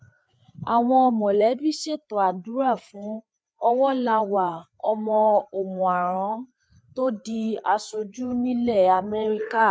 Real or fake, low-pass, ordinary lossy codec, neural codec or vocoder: fake; none; none; codec, 16 kHz, 4 kbps, FreqCodec, larger model